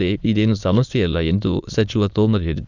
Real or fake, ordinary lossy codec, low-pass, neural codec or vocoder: fake; none; 7.2 kHz; autoencoder, 22.05 kHz, a latent of 192 numbers a frame, VITS, trained on many speakers